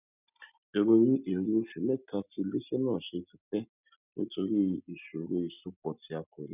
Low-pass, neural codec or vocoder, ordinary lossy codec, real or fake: 3.6 kHz; none; none; real